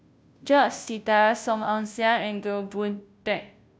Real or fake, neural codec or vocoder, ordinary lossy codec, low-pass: fake; codec, 16 kHz, 0.5 kbps, FunCodec, trained on Chinese and English, 25 frames a second; none; none